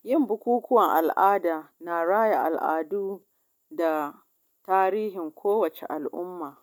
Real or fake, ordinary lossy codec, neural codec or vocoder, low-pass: real; MP3, 96 kbps; none; 19.8 kHz